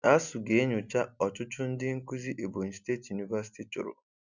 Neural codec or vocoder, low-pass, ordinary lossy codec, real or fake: none; 7.2 kHz; none; real